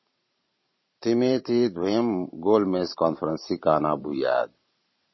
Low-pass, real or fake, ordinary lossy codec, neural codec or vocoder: 7.2 kHz; fake; MP3, 24 kbps; vocoder, 44.1 kHz, 128 mel bands every 512 samples, BigVGAN v2